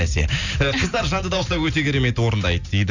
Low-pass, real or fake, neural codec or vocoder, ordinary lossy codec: 7.2 kHz; real; none; none